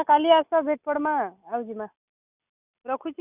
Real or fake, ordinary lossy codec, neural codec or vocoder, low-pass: real; none; none; 3.6 kHz